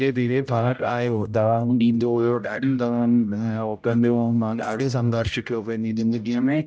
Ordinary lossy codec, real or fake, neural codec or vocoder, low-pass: none; fake; codec, 16 kHz, 0.5 kbps, X-Codec, HuBERT features, trained on general audio; none